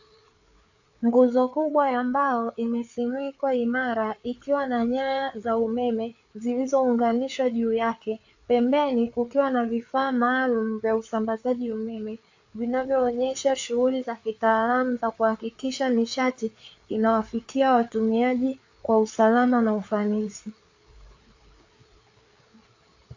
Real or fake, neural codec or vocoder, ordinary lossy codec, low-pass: fake; codec, 16 kHz, 4 kbps, FreqCodec, larger model; AAC, 48 kbps; 7.2 kHz